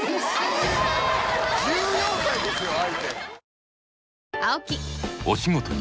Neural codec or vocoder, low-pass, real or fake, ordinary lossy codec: none; none; real; none